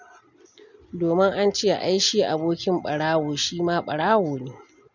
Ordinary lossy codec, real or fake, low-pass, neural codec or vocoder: none; real; 7.2 kHz; none